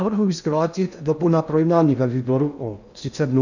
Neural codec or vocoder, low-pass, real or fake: codec, 16 kHz in and 24 kHz out, 0.6 kbps, FocalCodec, streaming, 2048 codes; 7.2 kHz; fake